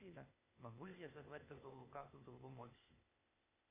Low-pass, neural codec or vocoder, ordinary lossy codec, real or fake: 3.6 kHz; codec, 16 kHz, 0.8 kbps, ZipCodec; MP3, 32 kbps; fake